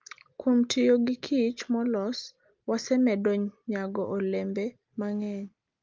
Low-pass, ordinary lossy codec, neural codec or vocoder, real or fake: 7.2 kHz; Opus, 32 kbps; none; real